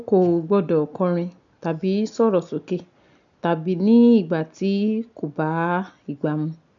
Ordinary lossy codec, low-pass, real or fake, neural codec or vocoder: none; 7.2 kHz; real; none